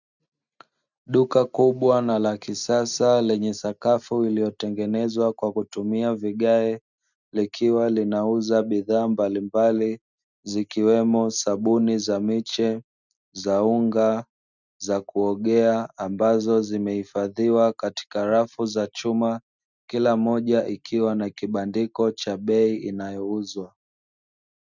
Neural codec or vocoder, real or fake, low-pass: none; real; 7.2 kHz